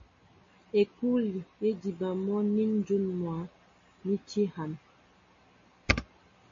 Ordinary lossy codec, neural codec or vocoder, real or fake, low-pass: MP3, 32 kbps; none; real; 7.2 kHz